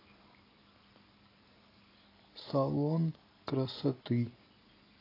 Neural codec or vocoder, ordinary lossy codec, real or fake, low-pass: none; AAC, 24 kbps; real; 5.4 kHz